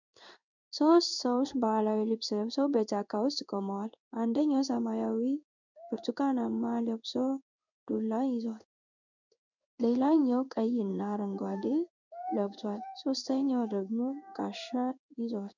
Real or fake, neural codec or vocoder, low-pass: fake; codec, 16 kHz in and 24 kHz out, 1 kbps, XY-Tokenizer; 7.2 kHz